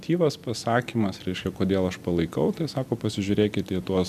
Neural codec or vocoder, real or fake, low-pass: vocoder, 44.1 kHz, 128 mel bands every 256 samples, BigVGAN v2; fake; 14.4 kHz